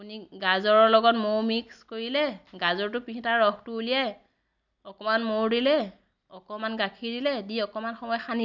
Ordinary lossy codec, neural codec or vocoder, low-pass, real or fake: none; none; 7.2 kHz; real